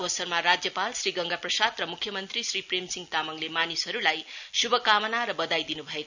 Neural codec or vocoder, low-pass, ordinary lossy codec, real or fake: none; 7.2 kHz; none; real